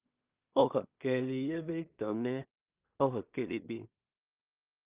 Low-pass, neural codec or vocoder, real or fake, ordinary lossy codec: 3.6 kHz; codec, 16 kHz in and 24 kHz out, 0.4 kbps, LongCat-Audio-Codec, two codebook decoder; fake; Opus, 24 kbps